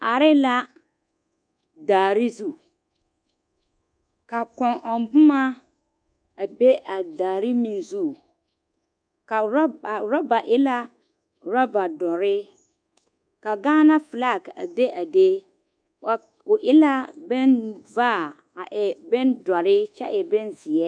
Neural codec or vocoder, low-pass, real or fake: codec, 24 kHz, 1.2 kbps, DualCodec; 9.9 kHz; fake